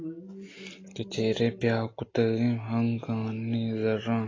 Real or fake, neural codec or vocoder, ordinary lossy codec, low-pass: real; none; AAC, 32 kbps; 7.2 kHz